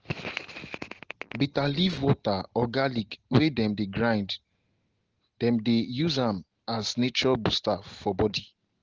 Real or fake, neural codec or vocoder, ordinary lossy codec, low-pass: real; none; Opus, 16 kbps; 7.2 kHz